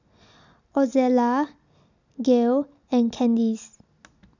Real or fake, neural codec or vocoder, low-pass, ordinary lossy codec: real; none; 7.2 kHz; none